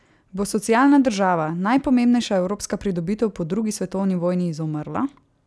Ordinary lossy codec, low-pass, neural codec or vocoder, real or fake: none; none; none; real